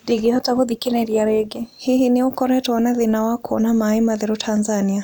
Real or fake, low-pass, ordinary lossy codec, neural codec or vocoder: real; none; none; none